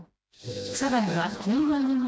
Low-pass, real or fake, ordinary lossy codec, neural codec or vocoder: none; fake; none; codec, 16 kHz, 1 kbps, FreqCodec, smaller model